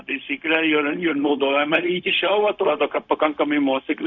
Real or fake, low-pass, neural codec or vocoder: fake; 7.2 kHz; codec, 16 kHz, 0.4 kbps, LongCat-Audio-Codec